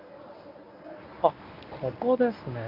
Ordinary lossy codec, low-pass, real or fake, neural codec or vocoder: none; 5.4 kHz; fake; codec, 24 kHz, 0.9 kbps, WavTokenizer, medium speech release version 1